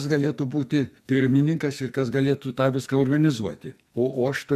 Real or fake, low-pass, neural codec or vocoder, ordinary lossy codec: fake; 14.4 kHz; codec, 44.1 kHz, 2.6 kbps, SNAC; MP3, 96 kbps